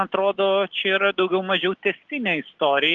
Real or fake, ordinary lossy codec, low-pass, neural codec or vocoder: real; Opus, 16 kbps; 7.2 kHz; none